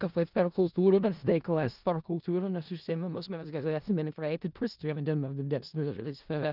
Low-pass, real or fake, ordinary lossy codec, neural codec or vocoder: 5.4 kHz; fake; Opus, 24 kbps; codec, 16 kHz in and 24 kHz out, 0.4 kbps, LongCat-Audio-Codec, four codebook decoder